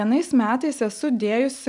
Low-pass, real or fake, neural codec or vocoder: 10.8 kHz; real; none